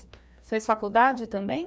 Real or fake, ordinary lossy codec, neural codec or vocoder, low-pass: fake; none; codec, 16 kHz, 2 kbps, FreqCodec, larger model; none